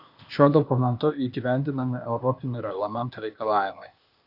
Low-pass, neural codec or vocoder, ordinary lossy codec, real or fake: 5.4 kHz; codec, 16 kHz, 0.8 kbps, ZipCodec; Opus, 64 kbps; fake